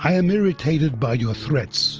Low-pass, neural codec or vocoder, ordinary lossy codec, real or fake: 7.2 kHz; none; Opus, 24 kbps; real